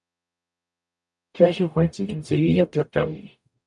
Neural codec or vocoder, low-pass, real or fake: codec, 44.1 kHz, 0.9 kbps, DAC; 10.8 kHz; fake